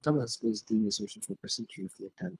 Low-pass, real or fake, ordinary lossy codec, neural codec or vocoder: none; fake; none; codec, 24 kHz, 3 kbps, HILCodec